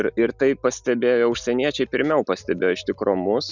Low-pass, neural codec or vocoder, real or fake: 7.2 kHz; none; real